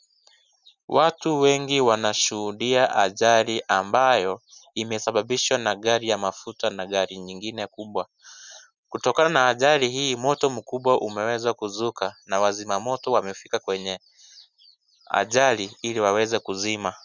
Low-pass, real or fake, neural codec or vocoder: 7.2 kHz; real; none